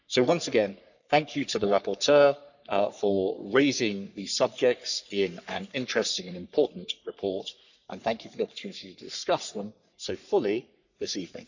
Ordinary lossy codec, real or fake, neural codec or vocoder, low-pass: none; fake; codec, 44.1 kHz, 3.4 kbps, Pupu-Codec; 7.2 kHz